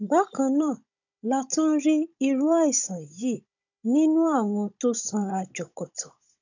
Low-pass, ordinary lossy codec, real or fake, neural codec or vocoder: 7.2 kHz; none; fake; codec, 16 kHz, 16 kbps, FunCodec, trained on Chinese and English, 50 frames a second